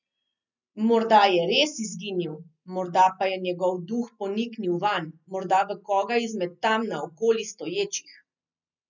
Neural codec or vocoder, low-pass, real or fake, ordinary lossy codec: none; 7.2 kHz; real; none